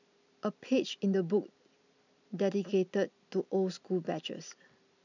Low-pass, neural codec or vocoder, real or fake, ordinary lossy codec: 7.2 kHz; none; real; none